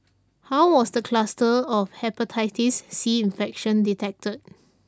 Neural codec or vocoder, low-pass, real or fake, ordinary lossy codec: none; none; real; none